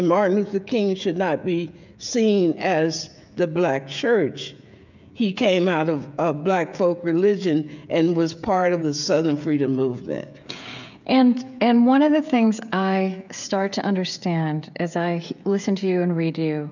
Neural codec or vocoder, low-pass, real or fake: codec, 16 kHz, 16 kbps, FreqCodec, smaller model; 7.2 kHz; fake